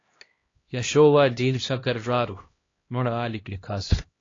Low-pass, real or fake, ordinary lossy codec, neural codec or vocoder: 7.2 kHz; fake; AAC, 32 kbps; codec, 16 kHz, 1 kbps, X-Codec, HuBERT features, trained on LibriSpeech